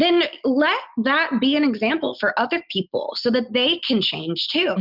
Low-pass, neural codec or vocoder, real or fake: 5.4 kHz; none; real